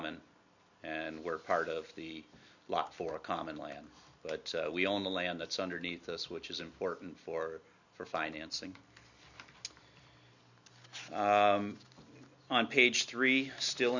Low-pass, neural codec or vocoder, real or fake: 7.2 kHz; none; real